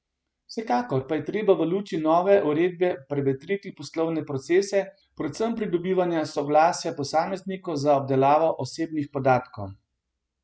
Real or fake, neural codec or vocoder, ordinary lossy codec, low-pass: real; none; none; none